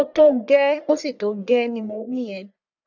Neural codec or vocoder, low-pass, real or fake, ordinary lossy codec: codec, 44.1 kHz, 1.7 kbps, Pupu-Codec; 7.2 kHz; fake; none